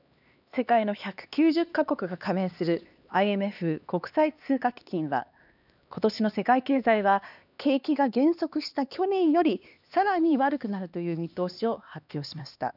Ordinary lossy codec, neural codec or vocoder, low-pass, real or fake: none; codec, 16 kHz, 2 kbps, X-Codec, HuBERT features, trained on LibriSpeech; 5.4 kHz; fake